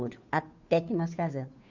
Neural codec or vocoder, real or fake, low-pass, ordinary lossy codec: codec, 16 kHz, 2 kbps, FunCodec, trained on Chinese and English, 25 frames a second; fake; 7.2 kHz; none